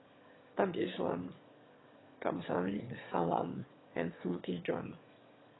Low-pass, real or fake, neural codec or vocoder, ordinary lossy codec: 7.2 kHz; fake; autoencoder, 22.05 kHz, a latent of 192 numbers a frame, VITS, trained on one speaker; AAC, 16 kbps